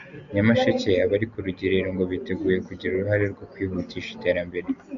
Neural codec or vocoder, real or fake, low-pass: none; real; 7.2 kHz